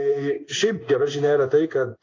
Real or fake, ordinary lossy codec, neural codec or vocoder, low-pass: fake; AAC, 32 kbps; codec, 16 kHz in and 24 kHz out, 1 kbps, XY-Tokenizer; 7.2 kHz